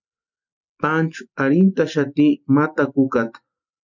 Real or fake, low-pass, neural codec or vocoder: real; 7.2 kHz; none